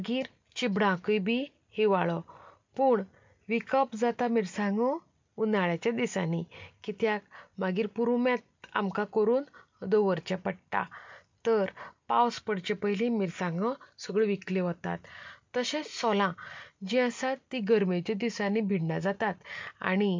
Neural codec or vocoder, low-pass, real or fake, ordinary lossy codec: none; 7.2 kHz; real; MP3, 64 kbps